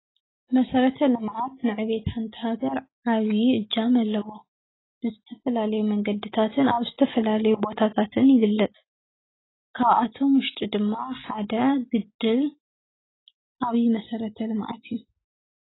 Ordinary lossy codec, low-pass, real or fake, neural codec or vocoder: AAC, 16 kbps; 7.2 kHz; real; none